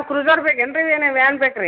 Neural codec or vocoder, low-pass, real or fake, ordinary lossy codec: none; 5.4 kHz; real; none